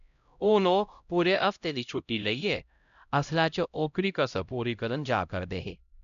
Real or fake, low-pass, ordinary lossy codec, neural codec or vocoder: fake; 7.2 kHz; none; codec, 16 kHz, 0.5 kbps, X-Codec, HuBERT features, trained on LibriSpeech